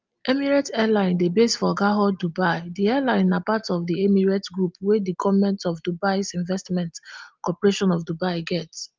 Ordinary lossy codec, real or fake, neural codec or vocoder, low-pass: Opus, 24 kbps; real; none; 7.2 kHz